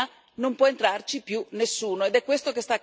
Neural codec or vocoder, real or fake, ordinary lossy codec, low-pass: none; real; none; none